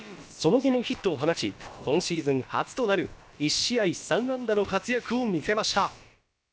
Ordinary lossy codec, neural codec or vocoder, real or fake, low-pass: none; codec, 16 kHz, about 1 kbps, DyCAST, with the encoder's durations; fake; none